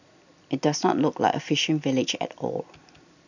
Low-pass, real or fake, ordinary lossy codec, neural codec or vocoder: 7.2 kHz; real; none; none